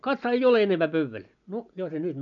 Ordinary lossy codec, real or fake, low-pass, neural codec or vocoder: none; real; 7.2 kHz; none